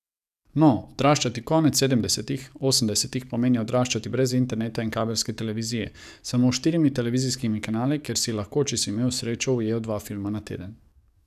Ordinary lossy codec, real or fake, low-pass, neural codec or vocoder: none; fake; 14.4 kHz; codec, 44.1 kHz, 7.8 kbps, DAC